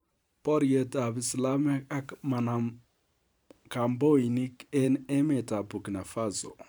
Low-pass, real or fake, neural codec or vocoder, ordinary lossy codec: none; fake; vocoder, 44.1 kHz, 128 mel bands every 512 samples, BigVGAN v2; none